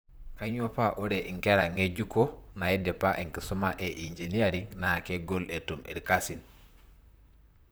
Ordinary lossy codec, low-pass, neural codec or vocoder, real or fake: none; none; vocoder, 44.1 kHz, 128 mel bands, Pupu-Vocoder; fake